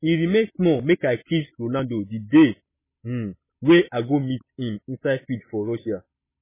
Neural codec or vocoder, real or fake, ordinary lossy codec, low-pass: none; real; MP3, 16 kbps; 3.6 kHz